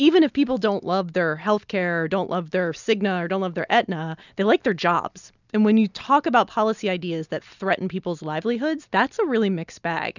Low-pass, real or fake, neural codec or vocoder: 7.2 kHz; real; none